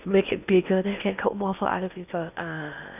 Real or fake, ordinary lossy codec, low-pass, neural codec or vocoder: fake; none; 3.6 kHz; codec, 16 kHz in and 24 kHz out, 0.8 kbps, FocalCodec, streaming, 65536 codes